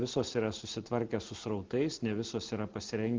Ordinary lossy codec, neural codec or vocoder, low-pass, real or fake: Opus, 16 kbps; none; 7.2 kHz; real